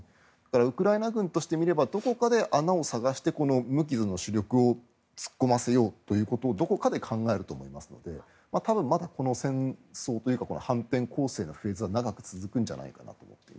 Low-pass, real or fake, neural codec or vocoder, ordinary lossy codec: none; real; none; none